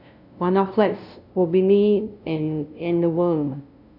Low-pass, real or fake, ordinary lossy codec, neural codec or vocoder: 5.4 kHz; fake; none; codec, 16 kHz, 0.5 kbps, FunCodec, trained on LibriTTS, 25 frames a second